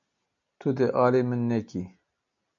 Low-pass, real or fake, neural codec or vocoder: 7.2 kHz; real; none